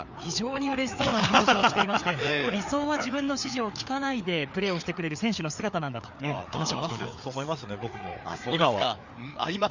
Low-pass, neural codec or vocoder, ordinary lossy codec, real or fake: 7.2 kHz; codec, 16 kHz, 4 kbps, FreqCodec, larger model; none; fake